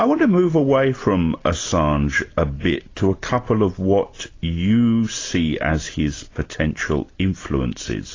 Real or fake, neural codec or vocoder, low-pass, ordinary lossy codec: real; none; 7.2 kHz; AAC, 32 kbps